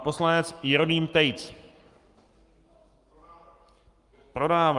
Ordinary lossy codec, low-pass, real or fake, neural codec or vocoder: Opus, 32 kbps; 10.8 kHz; fake; codec, 44.1 kHz, 7.8 kbps, Pupu-Codec